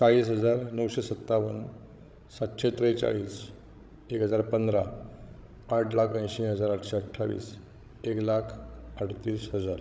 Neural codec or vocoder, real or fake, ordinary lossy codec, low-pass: codec, 16 kHz, 16 kbps, FreqCodec, larger model; fake; none; none